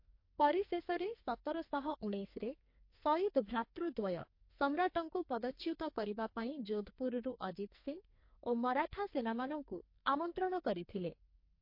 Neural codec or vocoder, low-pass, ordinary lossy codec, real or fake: codec, 44.1 kHz, 2.6 kbps, SNAC; 5.4 kHz; MP3, 32 kbps; fake